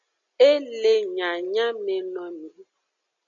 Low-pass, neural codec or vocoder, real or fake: 7.2 kHz; none; real